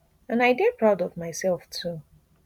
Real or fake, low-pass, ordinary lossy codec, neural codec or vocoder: fake; 19.8 kHz; none; vocoder, 44.1 kHz, 128 mel bands every 512 samples, BigVGAN v2